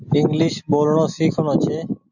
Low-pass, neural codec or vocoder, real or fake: 7.2 kHz; none; real